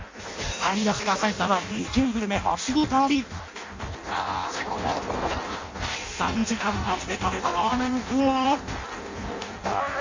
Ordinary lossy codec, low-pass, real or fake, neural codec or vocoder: MP3, 48 kbps; 7.2 kHz; fake; codec, 16 kHz in and 24 kHz out, 0.6 kbps, FireRedTTS-2 codec